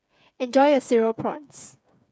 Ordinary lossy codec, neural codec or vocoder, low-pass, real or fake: none; codec, 16 kHz, 8 kbps, FreqCodec, smaller model; none; fake